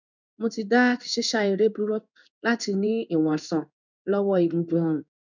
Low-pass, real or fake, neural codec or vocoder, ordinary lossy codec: 7.2 kHz; fake; codec, 16 kHz in and 24 kHz out, 1 kbps, XY-Tokenizer; none